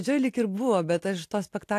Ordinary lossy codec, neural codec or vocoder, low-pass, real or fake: AAC, 64 kbps; none; 14.4 kHz; real